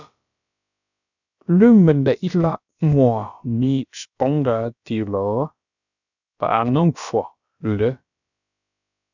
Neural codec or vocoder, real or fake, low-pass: codec, 16 kHz, about 1 kbps, DyCAST, with the encoder's durations; fake; 7.2 kHz